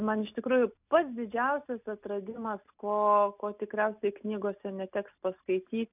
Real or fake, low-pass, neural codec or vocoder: real; 3.6 kHz; none